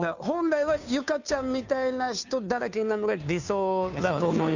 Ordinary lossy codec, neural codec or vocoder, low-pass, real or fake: none; codec, 16 kHz, 2 kbps, FunCodec, trained on Chinese and English, 25 frames a second; 7.2 kHz; fake